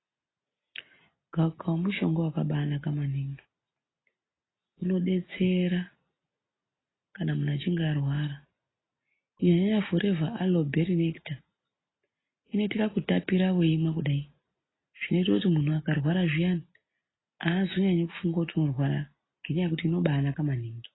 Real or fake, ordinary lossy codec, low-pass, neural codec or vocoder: real; AAC, 16 kbps; 7.2 kHz; none